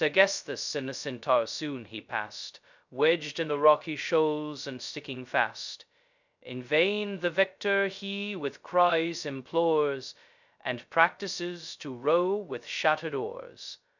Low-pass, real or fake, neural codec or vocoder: 7.2 kHz; fake; codec, 16 kHz, 0.2 kbps, FocalCodec